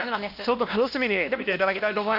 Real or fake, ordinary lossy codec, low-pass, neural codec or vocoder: fake; none; 5.4 kHz; codec, 16 kHz, 1 kbps, X-Codec, WavLM features, trained on Multilingual LibriSpeech